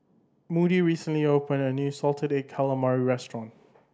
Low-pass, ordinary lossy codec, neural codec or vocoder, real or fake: none; none; none; real